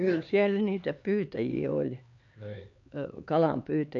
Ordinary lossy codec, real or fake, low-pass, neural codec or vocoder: MP3, 48 kbps; fake; 7.2 kHz; codec, 16 kHz, 4 kbps, X-Codec, HuBERT features, trained on LibriSpeech